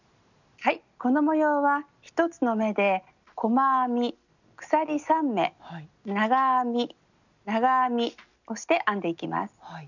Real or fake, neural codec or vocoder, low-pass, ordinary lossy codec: real; none; 7.2 kHz; none